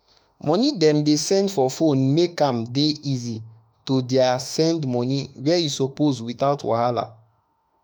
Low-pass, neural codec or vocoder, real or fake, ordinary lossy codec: none; autoencoder, 48 kHz, 32 numbers a frame, DAC-VAE, trained on Japanese speech; fake; none